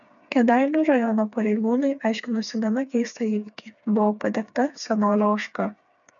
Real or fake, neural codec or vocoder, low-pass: fake; codec, 16 kHz, 4 kbps, FreqCodec, smaller model; 7.2 kHz